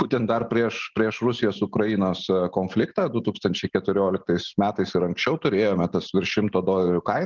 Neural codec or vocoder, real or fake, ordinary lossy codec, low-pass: none; real; Opus, 24 kbps; 7.2 kHz